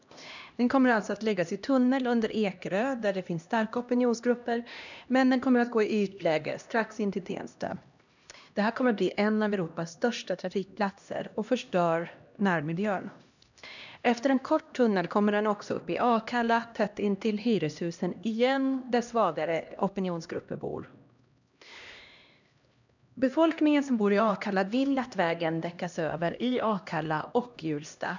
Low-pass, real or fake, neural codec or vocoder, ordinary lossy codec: 7.2 kHz; fake; codec, 16 kHz, 1 kbps, X-Codec, HuBERT features, trained on LibriSpeech; none